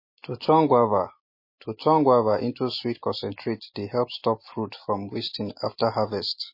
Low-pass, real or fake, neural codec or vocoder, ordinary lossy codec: 5.4 kHz; real; none; MP3, 24 kbps